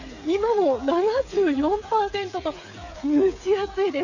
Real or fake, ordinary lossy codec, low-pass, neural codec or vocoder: fake; none; 7.2 kHz; codec, 16 kHz, 8 kbps, FreqCodec, smaller model